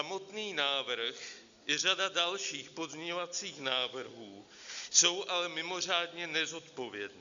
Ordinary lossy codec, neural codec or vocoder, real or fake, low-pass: Opus, 64 kbps; none; real; 7.2 kHz